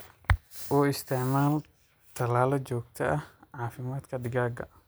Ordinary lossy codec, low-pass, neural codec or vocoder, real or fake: none; none; none; real